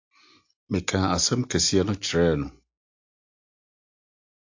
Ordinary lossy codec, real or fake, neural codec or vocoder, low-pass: AAC, 48 kbps; real; none; 7.2 kHz